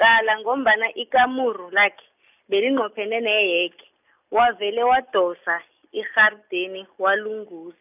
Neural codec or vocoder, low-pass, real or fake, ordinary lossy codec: none; 3.6 kHz; real; none